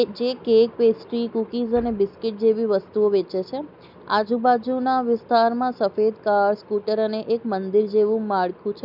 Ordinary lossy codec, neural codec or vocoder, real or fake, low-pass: none; none; real; 5.4 kHz